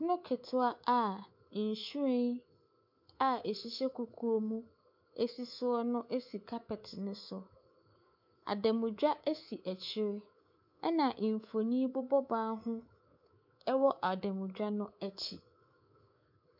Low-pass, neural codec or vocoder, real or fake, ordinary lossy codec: 5.4 kHz; codec, 24 kHz, 3.1 kbps, DualCodec; fake; MP3, 48 kbps